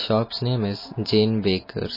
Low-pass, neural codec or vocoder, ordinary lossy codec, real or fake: 5.4 kHz; none; MP3, 24 kbps; real